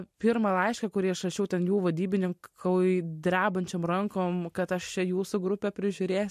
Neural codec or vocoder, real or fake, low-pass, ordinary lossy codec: none; real; 14.4 kHz; MP3, 64 kbps